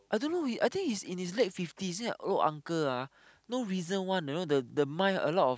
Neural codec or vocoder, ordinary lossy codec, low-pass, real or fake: none; none; none; real